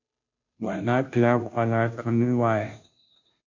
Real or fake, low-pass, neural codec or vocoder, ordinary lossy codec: fake; 7.2 kHz; codec, 16 kHz, 0.5 kbps, FunCodec, trained on Chinese and English, 25 frames a second; MP3, 48 kbps